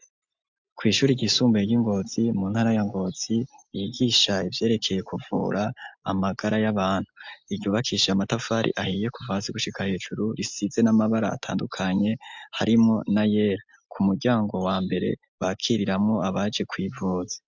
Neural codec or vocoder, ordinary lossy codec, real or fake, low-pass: none; MP3, 64 kbps; real; 7.2 kHz